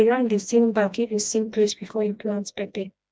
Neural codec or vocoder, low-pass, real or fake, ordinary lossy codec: codec, 16 kHz, 1 kbps, FreqCodec, smaller model; none; fake; none